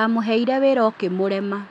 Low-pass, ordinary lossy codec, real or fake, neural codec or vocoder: 10.8 kHz; none; real; none